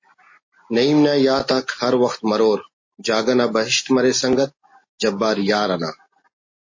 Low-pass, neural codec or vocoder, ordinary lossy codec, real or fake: 7.2 kHz; none; MP3, 32 kbps; real